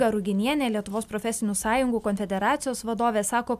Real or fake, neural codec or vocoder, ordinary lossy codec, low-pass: real; none; AAC, 96 kbps; 14.4 kHz